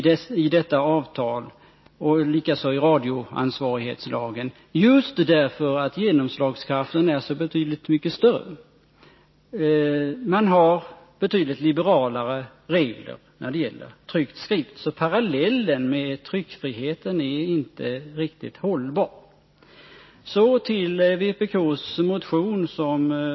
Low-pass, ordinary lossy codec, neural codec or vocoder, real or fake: 7.2 kHz; MP3, 24 kbps; none; real